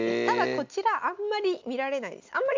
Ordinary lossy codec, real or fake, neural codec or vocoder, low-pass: MP3, 64 kbps; real; none; 7.2 kHz